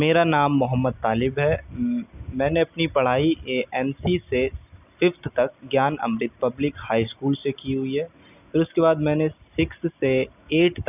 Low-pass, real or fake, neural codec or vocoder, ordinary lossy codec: 3.6 kHz; real; none; none